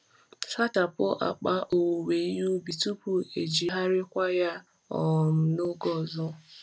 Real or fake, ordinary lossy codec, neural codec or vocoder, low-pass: real; none; none; none